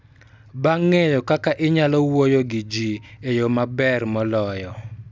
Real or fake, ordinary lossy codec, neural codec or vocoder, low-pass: real; none; none; none